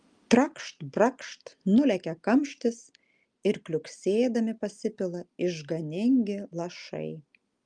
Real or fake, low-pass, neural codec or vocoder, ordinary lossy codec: real; 9.9 kHz; none; Opus, 32 kbps